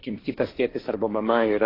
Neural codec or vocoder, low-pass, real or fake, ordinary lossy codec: codec, 16 kHz, 1.1 kbps, Voila-Tokenizer; 5.4 kHz; fake; AAC, 24 kbps